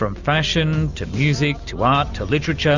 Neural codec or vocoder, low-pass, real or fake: none; 7.2 kHz; real